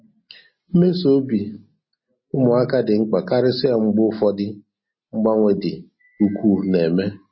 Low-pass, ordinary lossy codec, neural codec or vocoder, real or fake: 7.2 kHz; MP3, 24 kbps; none; real